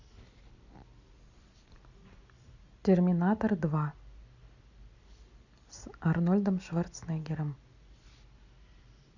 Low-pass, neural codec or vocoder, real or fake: 7.2 kHz; none; real